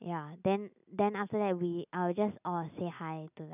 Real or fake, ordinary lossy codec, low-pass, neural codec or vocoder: real; none; 3.6 kHz; none